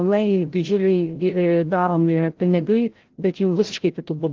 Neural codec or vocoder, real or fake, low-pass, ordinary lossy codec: codec, 16 kHz, 0.5 kbps, FreqCodec, larger model; fake; 7.2 kHz; Opus, 16 kbps